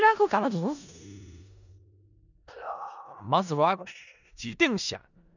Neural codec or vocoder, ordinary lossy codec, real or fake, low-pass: codec, 16 kHz in and 24 kHz out, 0.4 kbps, LongCat-Audio-Codec, four codebook decoder; none; fake; 7.2 kHz